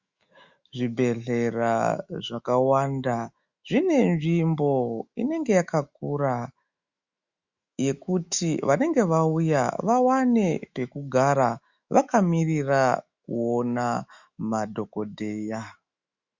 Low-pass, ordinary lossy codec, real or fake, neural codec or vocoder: 7.2 kHz; Opus, 64 kbps; fake; autoencoder, 48 kHz, 128 numbers a frame, DAC-VAE, trained on Japanese speech